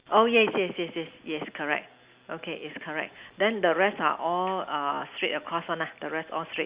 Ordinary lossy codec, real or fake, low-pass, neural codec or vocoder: Opus, 64 kbps; real; 3.6 kHz; none